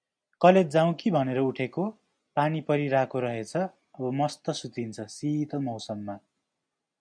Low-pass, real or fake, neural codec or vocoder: 9.9 kHz; real; none